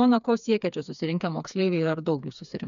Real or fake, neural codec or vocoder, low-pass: fake; codec, 16 kHz, 4 kbps, FreqCodec, smaller model; 7.2 kHz